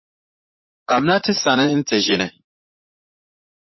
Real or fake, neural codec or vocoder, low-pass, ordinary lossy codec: fake; vocoder, 44.1 kHz, 80 mel bands, Vocos; 7.2 kHz; MP3, 24 kbps